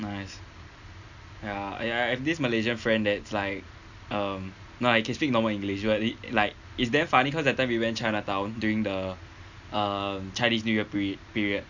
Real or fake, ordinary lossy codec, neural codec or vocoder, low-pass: real; none; none; 7.2 kHz